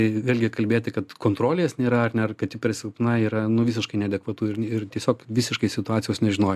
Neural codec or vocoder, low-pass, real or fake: none; 14.4 kHz; real